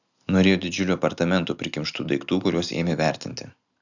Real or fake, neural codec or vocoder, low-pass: real; none; 7.2 kHz